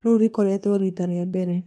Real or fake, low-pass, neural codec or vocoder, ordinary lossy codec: fake; none; codec, 24 kHz, 1 kbps, SNAC; none